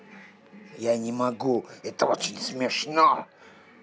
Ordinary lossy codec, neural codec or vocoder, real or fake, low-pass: none; none; real; none